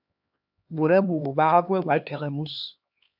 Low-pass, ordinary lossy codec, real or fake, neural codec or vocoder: 5.4 kHz; AAC, 48 kbps; fake; codec, 16 kHz, 2 kbps, X-Codec, HuBERT features, trained on LibriSpeech